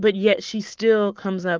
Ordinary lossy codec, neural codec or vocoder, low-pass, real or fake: Opus, 32 kbps; codec, 44.1 kHz, 7.8 kbps, Pupu-Codec; 7.2 kHz; fake